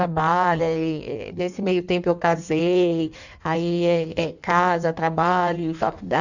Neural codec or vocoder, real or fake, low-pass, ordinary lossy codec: codec, 16 kHz in and 24 kHz out, 1.1 kbps, FireRedTTS-2 codec; fake; 7.2 kHz; none